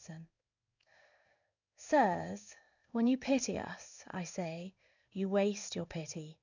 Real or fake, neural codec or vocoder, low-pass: fake; codec, 16 kHz in and 24 kHz out, 1 kbps, XY-Tokenizer; 7.2 kHz